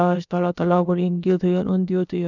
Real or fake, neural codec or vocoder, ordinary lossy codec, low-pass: fake; codec, 16 kHz, about 1 kbps, DyCAST, with the encoder's durations; none; 7.2 kHz